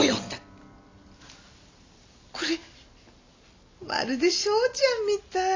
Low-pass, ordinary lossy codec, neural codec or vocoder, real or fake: 7.2 kHz; AAC, 32 kbps; none; real